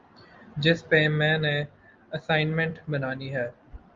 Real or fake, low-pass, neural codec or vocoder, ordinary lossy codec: real; 7.2 kHz; none; Opus, 32 kbps